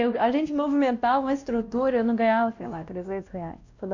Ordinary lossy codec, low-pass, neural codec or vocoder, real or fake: Opus, 64 kbps; 7.2 kHz; codec, 16 kHz, 1 kbps, X-Codec, WavLM features, trained on Multilingual LibriSpeech; fake